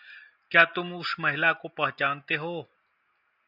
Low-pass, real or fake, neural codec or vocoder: 5.4 kHz; real; none